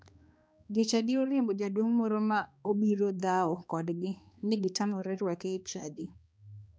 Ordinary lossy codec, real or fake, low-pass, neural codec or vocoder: none; fake; none; codec, 16 kHz, 2 kbps, X-Codec, HuBERT features, trained on balanced general audio